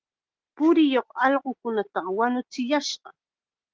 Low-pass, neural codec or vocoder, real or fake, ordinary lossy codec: 7.2 kHz; none; real; Opus, 16 kbps